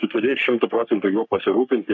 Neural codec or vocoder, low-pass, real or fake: codec, 44.1 kHz, 3.4 kbps, Pupu-Codec; 7.2 kHz; fake